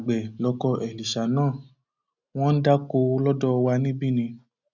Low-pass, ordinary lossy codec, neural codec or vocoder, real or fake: 7.2 kHz; none; none; real